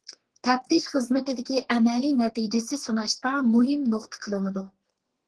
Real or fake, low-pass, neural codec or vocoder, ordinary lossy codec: fake; 10.8 kHz; codec, 32 kHz, 1.9 kbps, SNAC; Opus, 16 kbps